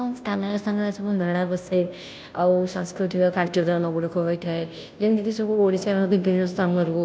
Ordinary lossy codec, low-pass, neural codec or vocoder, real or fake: none; none; codec, 16 kHz, 0.5 kbps, FunCodec, trained on Chinese and English, 25 frames a second; fake